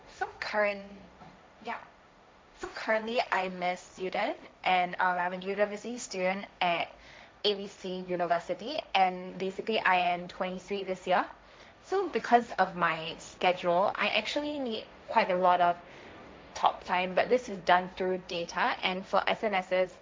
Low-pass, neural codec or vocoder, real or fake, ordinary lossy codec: none; codec, 16 kHz, 1.1 kbps, Voila-Tokenizer; fake; none